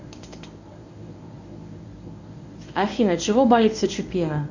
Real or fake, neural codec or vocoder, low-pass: fake; codec, 24 kHz, 0.9 kbps, WavTokenizer, medium speech release version 1; 7.2 kHz